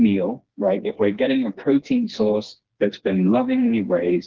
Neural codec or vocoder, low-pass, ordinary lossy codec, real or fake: codec, 16 kHz, 2 kbps, FreqCodec, smaller model; 7.2 kHz; Opus, 16 kbps; fake